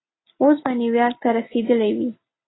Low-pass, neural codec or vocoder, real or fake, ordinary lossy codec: 7.2 kHz; none; real; AAC, 16 kbps